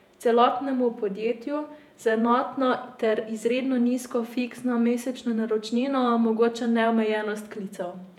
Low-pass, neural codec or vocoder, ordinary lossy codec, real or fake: 19.8 kHz; vocoder, 48 kHz, 128 mel bands, Vocos; none; fake